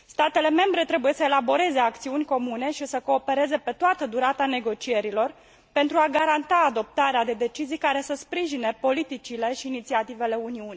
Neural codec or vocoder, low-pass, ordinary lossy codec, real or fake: none; none; none; real